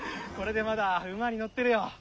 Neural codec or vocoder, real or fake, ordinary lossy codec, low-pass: none; real; none; none